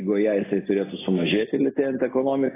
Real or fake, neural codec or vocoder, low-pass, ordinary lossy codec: real; none; 3.6 kHz; AAC, 16 kbps